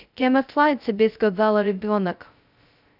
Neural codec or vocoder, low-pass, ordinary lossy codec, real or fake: codec, 16 kHz, 0.2 kbps, FocalCodec; 5.4 kHz; none; fake